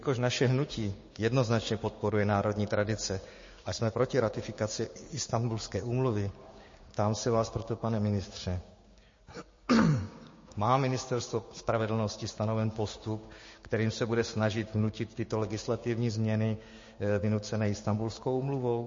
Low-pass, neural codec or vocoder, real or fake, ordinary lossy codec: 7.2 kHz; codec, 16 kHz, 6 kbps, DAC; fake; MP3, 32 kbps